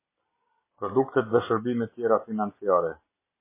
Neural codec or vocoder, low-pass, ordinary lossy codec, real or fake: none; 3.6 kHz; MP3, 16 kbps; real